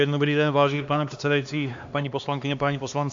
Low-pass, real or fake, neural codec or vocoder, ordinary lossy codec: 7.2 kHz; fake; codec, 16 kHz, 2 kbps, X-Codec, HuBERT features, trained on LibriSpeech; AAC, 64 kbps